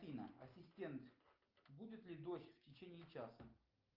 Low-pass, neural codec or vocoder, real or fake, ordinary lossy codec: 5.4 kHz; none; real; Opus, 32 kbps